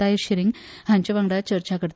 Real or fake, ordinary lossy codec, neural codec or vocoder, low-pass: real; none; none; none